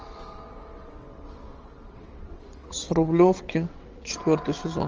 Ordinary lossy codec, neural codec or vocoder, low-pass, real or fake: Opus, 24 kbps; vocoder, 22.05 kHz, 80 mel bands, WaveNeXt; 7.2 kHz; fake